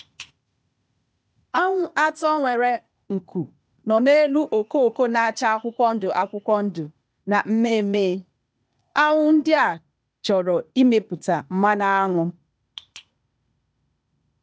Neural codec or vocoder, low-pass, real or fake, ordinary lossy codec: codec, 16 kHz, 0.8 kbps, ZipCodec; none; fake; none